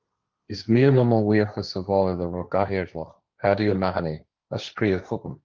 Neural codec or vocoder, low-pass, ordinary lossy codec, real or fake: codec, 16 kHz, 1.1 kbps, Voila-Tokenizer; 7.2 kHz; Opus, 24 kbps; fake